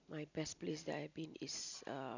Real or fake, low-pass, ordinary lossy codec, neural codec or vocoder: real; 7.2 kHz; none; none